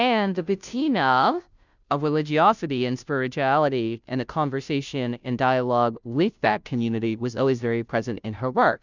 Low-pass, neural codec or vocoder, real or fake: 7.2 kHz; codec, 16 kHz, 0.5 kbps, FunCodec, trained on Chinese and English, 25 frames a second; fake